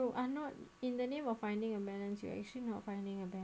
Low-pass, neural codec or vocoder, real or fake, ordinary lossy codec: none; none; real; none